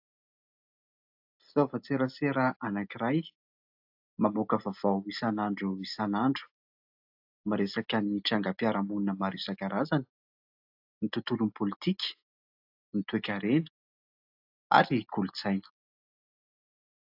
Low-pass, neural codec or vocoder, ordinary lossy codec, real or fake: 5.4 kHz; none; AAC, 48 kbps; real